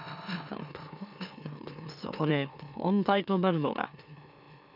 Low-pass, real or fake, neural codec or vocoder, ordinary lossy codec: 5.4 kHz; fake; autoencoder, 44.1 kHz, a latent of 192 numbers a frame, MeloTTS; none